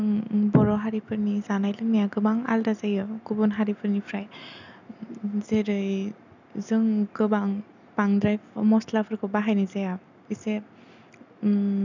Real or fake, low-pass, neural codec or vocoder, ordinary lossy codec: real; 7.2 kHz; none; none